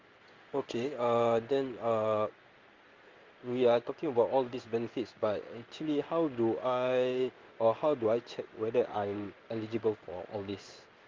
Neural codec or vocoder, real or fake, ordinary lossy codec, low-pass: codec, 16 kHz in and 24 kHz out, 1 kbps, XY-Tokenizer; fake; Opus, 32 kbps; 7.2 kHz